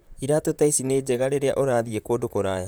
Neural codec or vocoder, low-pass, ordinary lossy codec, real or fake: vocoder, 44.1 kHz, 128 mel bands, Pupu-Vocoder; none; none; fake